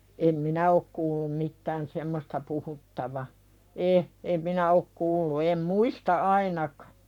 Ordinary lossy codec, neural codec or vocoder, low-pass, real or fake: none; codec, 44.1 kHz, 7.8 kbps, Pupu-Codec; 19.8 kHz; fake